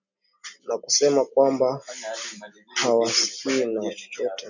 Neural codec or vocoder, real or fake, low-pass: none; real; 7.2 kHz